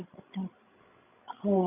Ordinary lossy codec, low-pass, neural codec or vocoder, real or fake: none; 3.6 kHz; codec, 16 kHz, 16 kbps, FreqCodec, larger model; fake